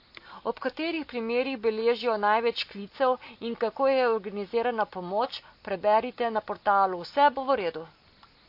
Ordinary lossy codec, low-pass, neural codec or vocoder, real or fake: MP3, 32 kbps; 5.4 kHz; none; real